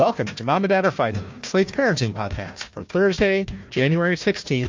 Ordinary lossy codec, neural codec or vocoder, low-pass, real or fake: MP3, 48 kbps; codec, 16 kHz, 1 kbps, FunCodec, trained on Chinese and English, 50 frames a second; 7.2 kHz; fake